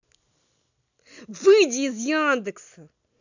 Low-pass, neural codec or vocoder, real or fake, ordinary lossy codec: 7.2 kHz; none; real; none